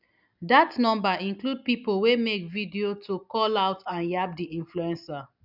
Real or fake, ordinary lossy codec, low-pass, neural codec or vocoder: real; none; 5.4 kHz; none